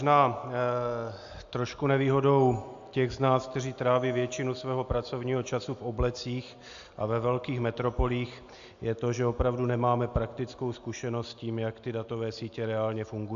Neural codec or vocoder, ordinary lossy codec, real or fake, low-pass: none; AAC, 64 kbps; real; 7.2 kHz